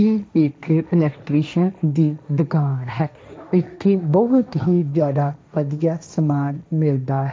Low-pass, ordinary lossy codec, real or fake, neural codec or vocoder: none; none; fake; codec, 16 kHz, 1.1 kbps, Voila-Tokenizer